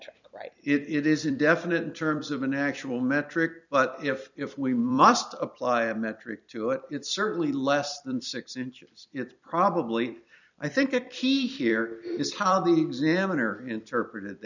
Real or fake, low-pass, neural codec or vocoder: fake; 7.2 kHz; vocoder, 44.1 kHz, 128 mel bands every 256 samples, BigVGAN v2